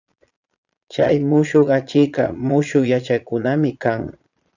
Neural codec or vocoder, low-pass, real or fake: vocoder, 22.05 kHz, 80 mel bands, Vocos; 7.2 kHz; fake